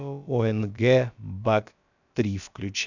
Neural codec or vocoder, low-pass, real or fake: codec, 16 kHz, about 1 kbps, DyCAST, with the encoder's durations; 7.2 kHz; fake